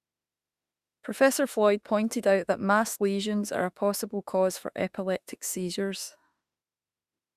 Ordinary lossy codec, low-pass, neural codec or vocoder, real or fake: Opus, 64 kbps; 14.4 kHz; autoencoder, 48 kHz, 32 numbers a frame, DAC-VAE, trained on Japanese speech; fake